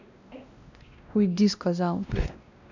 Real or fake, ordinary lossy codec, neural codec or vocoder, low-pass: fake; none; codec, 16 kHz, 1 kbps, X-Codec, WavLM features, trained on Multilingual LibriSpeech; 7.2 kHz